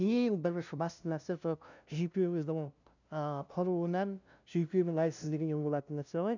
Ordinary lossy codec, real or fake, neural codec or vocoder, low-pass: none; fake; codec, 16 kHz, 0.5 kbps, FunCodec, trained on LibriTTS, 25 frames a second; 7.2 kHz